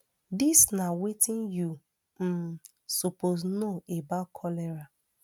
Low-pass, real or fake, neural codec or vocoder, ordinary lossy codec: none; real; none; none